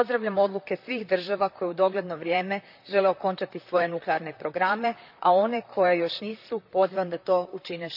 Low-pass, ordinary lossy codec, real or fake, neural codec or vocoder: 5.4 kHz; none; fake; vocoder, 44.1 kHz, 128 mel bands, Pupu-Vocoder